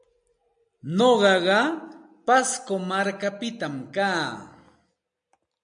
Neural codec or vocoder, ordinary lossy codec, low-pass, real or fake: none; MP3, 64 kbps; 9.9 kHz; real